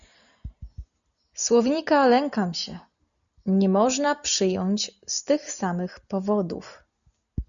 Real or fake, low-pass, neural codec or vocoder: real; 7.2 kHz; none